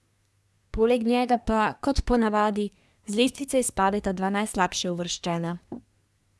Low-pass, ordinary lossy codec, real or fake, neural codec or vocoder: none; none; fake; codec, 24 kHz, 1 kbps, SNAC